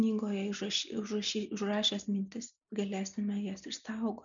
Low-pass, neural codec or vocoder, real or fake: 7.2 kHz; none; real